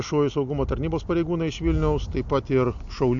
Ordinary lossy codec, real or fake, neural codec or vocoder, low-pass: AAC, 64 kbps; real; none; 7.2 kHz